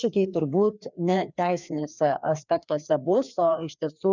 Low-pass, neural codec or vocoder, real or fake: 7.2 kHz; codec, 16 kHz, 2 kbps, FreqCodec, larger model; fake